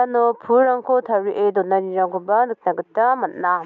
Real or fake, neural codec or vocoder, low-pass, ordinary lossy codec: real; none; 7.2 kHz; none